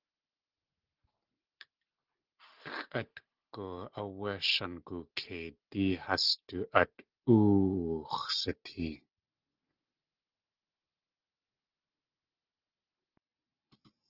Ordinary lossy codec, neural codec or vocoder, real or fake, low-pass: Opus, 16 kbps; none; real; 5.4 kHz